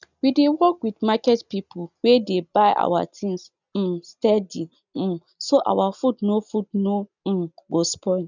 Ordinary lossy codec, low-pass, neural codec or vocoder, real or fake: none; 7.2 kHz; none; real